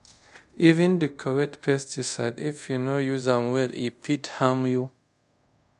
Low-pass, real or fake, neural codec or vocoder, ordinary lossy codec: 10.8 kHz; fake; codec, 24 kHz, 0.5 kbps, DualCodec; MP3, 64 kbps